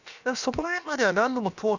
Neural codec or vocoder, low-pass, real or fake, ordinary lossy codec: codec, 16 kHz, about 1 kbps, DyCAST, with the encoder's durations; 7.2 kHz; fake; none